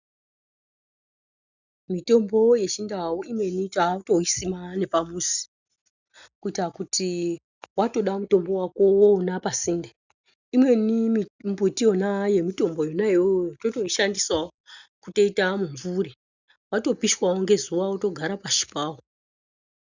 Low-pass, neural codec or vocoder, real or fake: 7.2 kHz; none; real